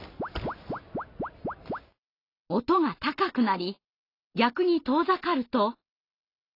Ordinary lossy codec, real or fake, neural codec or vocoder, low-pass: AAC, 32 kbps; real; none; 5.4 kHz